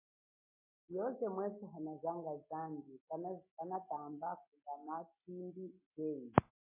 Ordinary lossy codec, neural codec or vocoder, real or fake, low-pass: MP3, 16 kbps; none; real; 3.6 kHz